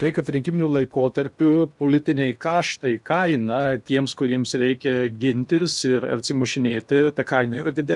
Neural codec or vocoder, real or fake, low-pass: codec, 16 kHz in and 24 kHz out, 0.8 kbps, FocalCodec, streaming, 65536 codes; fake; 10.8 kHz